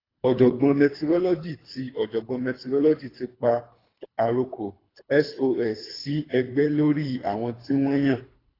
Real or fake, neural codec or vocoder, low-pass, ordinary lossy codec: fake; codec, 24 kHz, 3 kbps, HILCodec; 5.4 kHz; AAC, 24 kbps